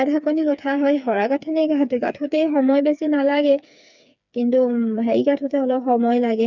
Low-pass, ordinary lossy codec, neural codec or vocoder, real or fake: 7.2 kHz; none; codec, 16 kHz, 4 kbps, FreqCodec, smaller model; fake